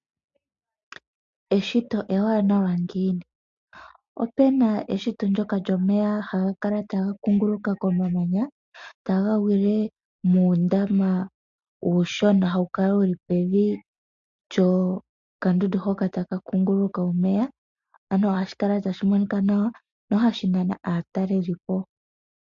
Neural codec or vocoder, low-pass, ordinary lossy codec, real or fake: none; 7.2 kHz; MP3, 48 kbps; real